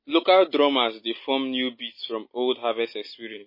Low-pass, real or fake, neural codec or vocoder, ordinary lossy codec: 5.4 kHz; real; none; MP3, 24 kbps